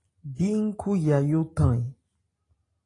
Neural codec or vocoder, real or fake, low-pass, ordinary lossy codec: none; real; 10.8 kHz; AAC, 32 kbps